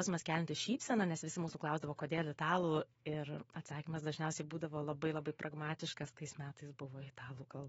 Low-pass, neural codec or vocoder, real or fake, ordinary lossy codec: 19.8 kHz; autoencoder, 48 kHz, 128 numbers a frame, DAC-VAE, trained on Japanese speech; fake; AAC, 24 kbps